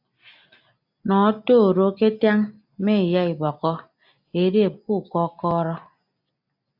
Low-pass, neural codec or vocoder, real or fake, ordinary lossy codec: 5.4 kHz; none; real; AAC, 48 kbps